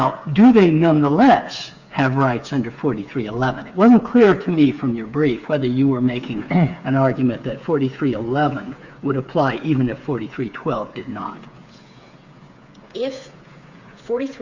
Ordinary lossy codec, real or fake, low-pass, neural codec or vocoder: Opus, 64 kbps; fake; 7.2 kHz; codec, 16 kHz, 8 kbps, FreqCodec, smaller model